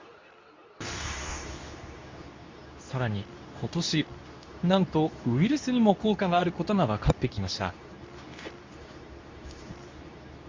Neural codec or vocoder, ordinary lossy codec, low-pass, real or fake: codec, 24 kHz, 0.9 kbps, WavTokenizer, medium speech release version 2; MP3, 64 kbps; 7.2 kHz; fake